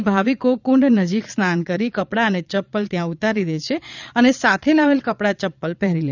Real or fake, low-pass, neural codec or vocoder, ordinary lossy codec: fake; 7.2 kHz; vocoder, 22.05 kHz, 80 mel bands, Vocos; none